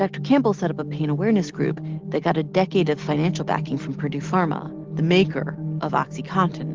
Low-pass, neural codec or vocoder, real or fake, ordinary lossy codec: 7.2 kHz; none; real; Opus, 16 kbps